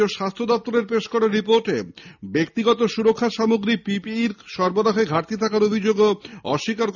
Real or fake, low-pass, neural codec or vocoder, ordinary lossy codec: real; 7.2 kHz; none; none